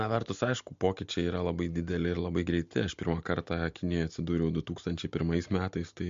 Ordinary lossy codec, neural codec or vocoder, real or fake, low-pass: MP3, 48 kbps; none; real; 7.2 kHz